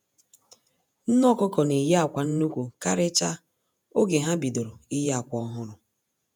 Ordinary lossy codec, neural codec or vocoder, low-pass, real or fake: none; vocoder, 48 kHz, 128 mel bands, Vocos; none; fake